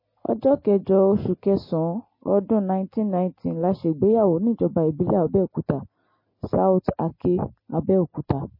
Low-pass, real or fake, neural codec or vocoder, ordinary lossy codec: 5.4 kHz; real; none; MP3, 24 kbps